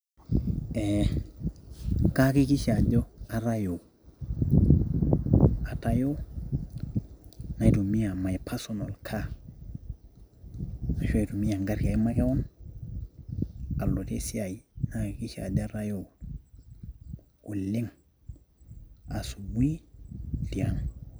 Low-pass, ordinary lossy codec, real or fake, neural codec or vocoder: none; none; real; none